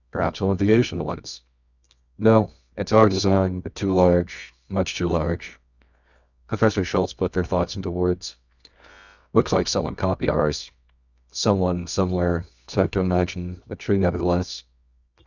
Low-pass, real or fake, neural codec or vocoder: 7.2 kHz; fake; codec, 24 kHz, 0.9 kbps, WavTokenizer, medium music audio release